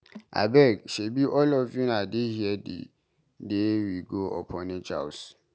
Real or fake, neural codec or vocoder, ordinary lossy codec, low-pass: real; none; none; none